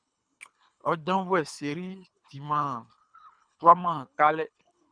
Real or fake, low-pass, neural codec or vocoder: fake; 9.9 kHz; codec, 24 kHz, 3 kbps, HILCodec